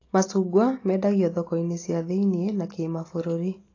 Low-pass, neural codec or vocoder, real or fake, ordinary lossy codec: 7.2 kHz; none; real; AAC, 32 kbps